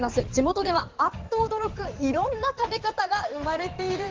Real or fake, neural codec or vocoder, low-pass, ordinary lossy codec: fake; codec, 16 kHz in and 24 kHz out, 2.2 kbps, FireRedTTS-2 codec; 7.2 kHz; Opus, 16 kbps